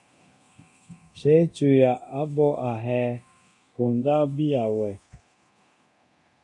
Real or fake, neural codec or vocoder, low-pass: fake; codec, 24 kHz, 0.9 kbps, DualCodec; 10.8 kHz